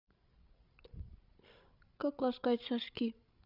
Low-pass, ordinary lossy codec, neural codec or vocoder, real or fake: 5.4 kHz; none; codec, 16 kHz, 16 kbps, FreqCodec, larger model; fake